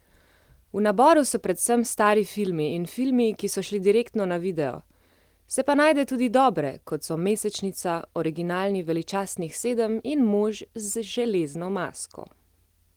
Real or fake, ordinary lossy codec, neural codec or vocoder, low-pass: real; Opus, 24 kbps; none; 19.8 kHz